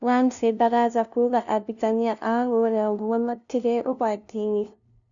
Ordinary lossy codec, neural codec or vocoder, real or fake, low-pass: none; codec, 16 kHz, 0.5 kbps, FunCodec, trained on LibriTTS, 25 frames a second; fake; 7.2 kHz